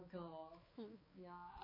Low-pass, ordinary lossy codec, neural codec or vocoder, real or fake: 5.4 kHz; MP3, 24 kbps; codec, 16 kHz, 4 kbps, X-Codec, HuBERT features, trained on balanced general audio; fake